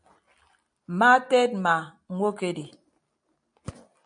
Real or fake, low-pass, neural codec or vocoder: real; 9.9 kHz; none